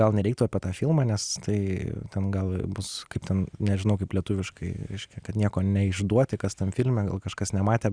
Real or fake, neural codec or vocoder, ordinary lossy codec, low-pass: real; none; Opus, 64 kbps; 9.9 kHz